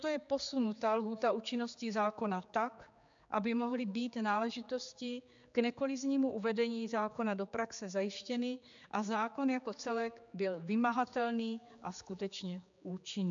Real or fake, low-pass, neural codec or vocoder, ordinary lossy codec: fake; 7.2 kHz; codec, 16 kHz, 4 kbps, X-Codec, HuBERT features, trained on general audio; MP3, 64 kbps